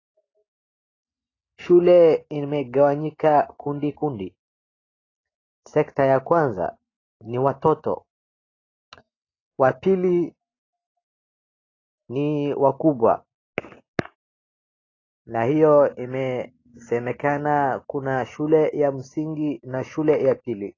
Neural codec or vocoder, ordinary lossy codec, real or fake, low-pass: none; AAC, 32 kbps; real; 7.2 kHz